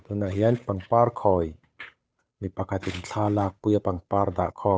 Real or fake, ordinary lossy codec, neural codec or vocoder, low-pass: fake; none; codec, 16 kHz, 8 kbps, FunCodec, trained on Chinese and English, 25 frames a second; none